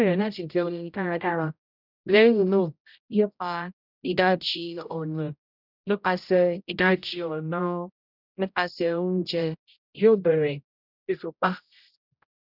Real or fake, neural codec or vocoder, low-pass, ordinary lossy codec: fake; codec, 16 kHz, 0.5 kbps, X-Codec, HuBERT features, trained on general audio; 5.4 kHz; none